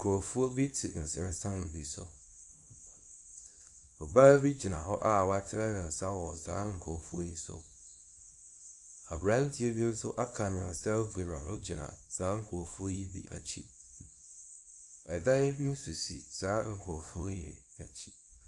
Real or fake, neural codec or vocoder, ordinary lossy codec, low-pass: fake; codec, 24 kHz, 0.9 kbps, WavTokenizer, small release; AAC, 64 kbps; 10.8 kHz